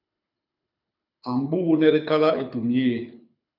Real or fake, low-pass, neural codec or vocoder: fake; 5.4 kHz; codec, 24 kHz, 6 kbps, HILCodec